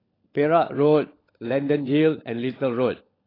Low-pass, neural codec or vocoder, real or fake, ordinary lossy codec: 5.4 kHz; codec, 16 kHz, 16 kbps, FunCodec, trained on LibriTTS, 50 frames a second; fake; AAC, 24 kbps